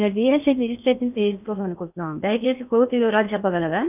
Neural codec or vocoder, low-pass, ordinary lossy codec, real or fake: codec, 16 kHz in and 24 kHz out, 0.8 kbps, FocalCodec, streaming, 65536 codes; 3.6 kHz; none; fake